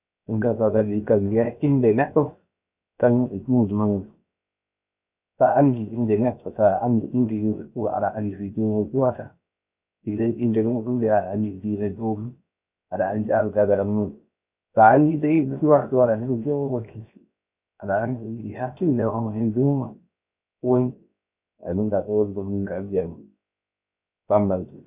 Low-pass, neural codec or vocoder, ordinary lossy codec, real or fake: 3.6 kHz; codec, 16 kHz, 0.7 kbps, FocalCodec; none; fake